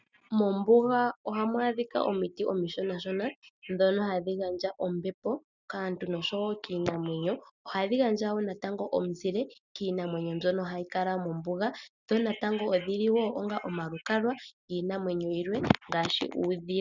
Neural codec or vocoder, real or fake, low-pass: none; real; 7.2 kHz